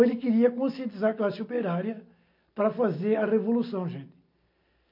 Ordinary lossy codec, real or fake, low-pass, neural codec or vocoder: MP3, 32 kbps; real; 5.4 kHz; none